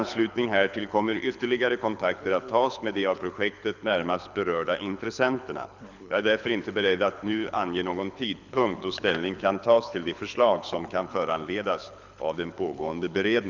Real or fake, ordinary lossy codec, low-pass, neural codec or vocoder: fake; none; 7.2 kHz; codec, 24 kHz, 6 kbps, HILCodec